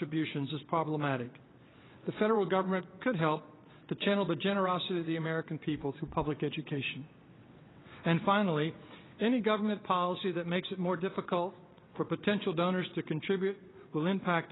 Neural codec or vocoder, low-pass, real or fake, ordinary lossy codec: vocoder, 22.05 kHz, 80 mel bands, WaveNeXt; 7.2 kHz; fake; AAC, 16 kbps